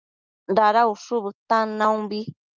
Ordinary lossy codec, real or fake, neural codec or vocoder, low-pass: Opus, 24 kbps; real; none; 7.2 kHz